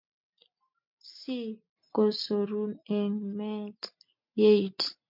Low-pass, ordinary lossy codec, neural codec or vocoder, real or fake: 5.4 kHz; MP3, 48 kbps; none; real